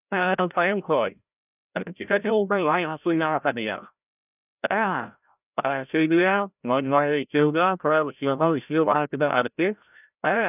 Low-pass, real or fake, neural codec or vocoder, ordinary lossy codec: 3.6 kHz; fake; codec, 16 kHz, 0.5 kbps, FreqCodec, larger model; none